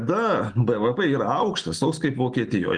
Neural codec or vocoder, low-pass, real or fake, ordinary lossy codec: none; 9.9 kHz; real; Opus, 24 kbps